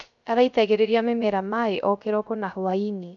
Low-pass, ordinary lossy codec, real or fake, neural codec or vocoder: 7.2 kHz; none; fake; codec, 16 kHz, 0.3 kbps, FocalCodec